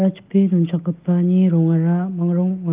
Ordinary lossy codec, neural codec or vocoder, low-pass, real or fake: Opus, 24 kbps; none; 3.6 kHz; real